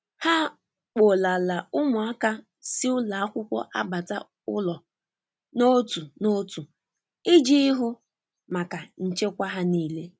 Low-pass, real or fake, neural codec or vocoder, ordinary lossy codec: none; real; none; none